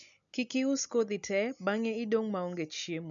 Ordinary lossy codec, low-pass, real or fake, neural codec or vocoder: none; 7.2 kHz; real; none